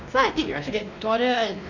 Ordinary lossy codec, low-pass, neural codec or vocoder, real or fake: none; 7.2 kHz; codec, 16 kHz, 1 kbps, X-Codec, WavLM features, trained on Multilingual LibriSpeech; fake